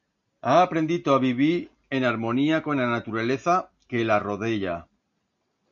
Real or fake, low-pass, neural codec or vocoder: real; 7.2 kHz; none